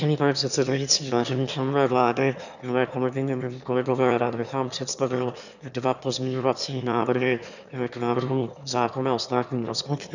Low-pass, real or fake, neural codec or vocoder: 7.2 kHz; fake; autoencoder, 22.05 kHz, a latent of 192 numbers a frame, VITS, trained on one speaker